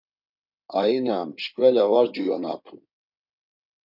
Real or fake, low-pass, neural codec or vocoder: fake; 5.4 kHz; codec, 16 kHz in and 24 kHz out, 2.2 kbps, FireRedTTS-2 codec